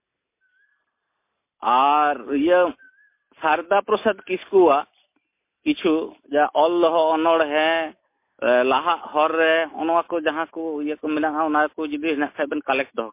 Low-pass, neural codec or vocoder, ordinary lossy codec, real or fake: 3.6 kHz; none; MP3, 24 kbps; real